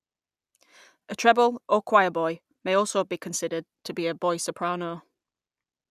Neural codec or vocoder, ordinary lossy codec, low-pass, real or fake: none; none; 14.4 kHz; real